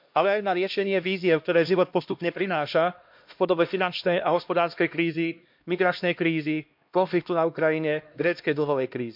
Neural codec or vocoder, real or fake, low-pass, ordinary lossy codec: codec, 16 kHz, 1 kbps, X-Codec, HuBERT features, trained on LibriSpeech; fake; 5.4 kHz; MP3, 48 kbps